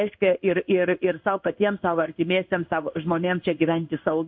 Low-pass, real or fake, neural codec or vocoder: 7.2 kHz; fake; codec, 16 kHz in and 24 kHz out, 1 kbps, XY-Tokenizer